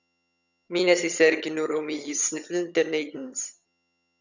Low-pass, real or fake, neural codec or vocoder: 7.2 kHz; fake; vocoder, 22.05 kHz, 80 mel bands, HiFi-GAN